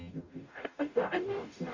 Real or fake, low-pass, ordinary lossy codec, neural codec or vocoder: fake; 7.2 kHz; Opus, 64 kbps; codec, 44.1 kHz, 0.9 kbps, DAC